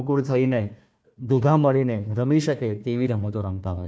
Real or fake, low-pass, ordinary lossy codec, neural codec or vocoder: fake; none; none; codec, 16 kHz, 1 kbps, FunCodec, trained on Chinese and English, 50 frames a second